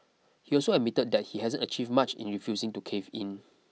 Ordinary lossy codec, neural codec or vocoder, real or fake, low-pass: none; none; real; none